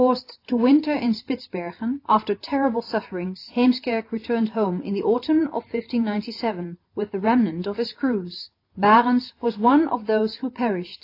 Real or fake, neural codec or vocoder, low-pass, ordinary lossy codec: real; none; 5.4 kHz; AAC, 32 kbps